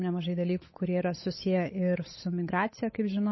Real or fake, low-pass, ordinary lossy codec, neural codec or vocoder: fake; 7.2 kHz; MP3, 24 kbps; codec, 16 kHz, 8 kbps, FunCodec, trained on Chinese and English, 25 frames a second